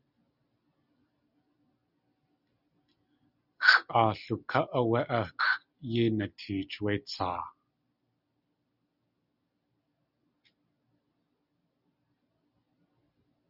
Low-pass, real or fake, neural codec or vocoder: 5.4 kHz; real; none